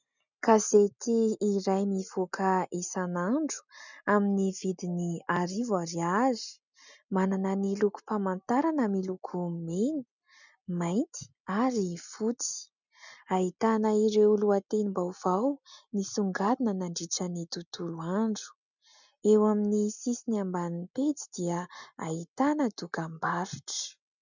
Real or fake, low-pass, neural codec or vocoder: real; 7.2 kHz; none